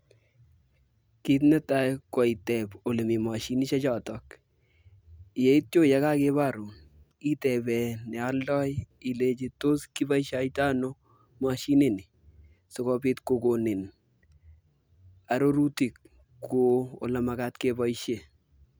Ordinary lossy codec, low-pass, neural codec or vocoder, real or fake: none; none; none; real